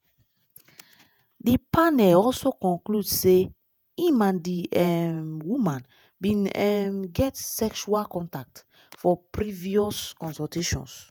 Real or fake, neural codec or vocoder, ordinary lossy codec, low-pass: fake; vocoder, 48 kHz, 128 mel bands, Vocos; none; 19.8 kHz